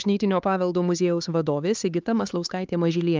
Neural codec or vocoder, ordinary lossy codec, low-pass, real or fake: codec, 16 kHz, 4 kbps, X-Codec, HuBERT features, trained on LibriSpeech; Opus, 24 kbps; 7.2 kHz; fake